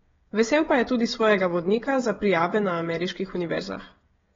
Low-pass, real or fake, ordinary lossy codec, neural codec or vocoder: 7.2 kHz; fake; AAC, 24 kbps; codec, 16 kHz, 16 kbps, FreqCodec, smaller model